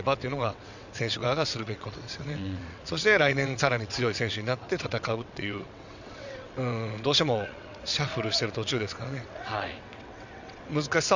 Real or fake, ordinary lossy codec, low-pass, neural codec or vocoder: fake; none; 7.2 kHz; vocoder, 22.05 kHz, 80 mel bands, WaveNeXt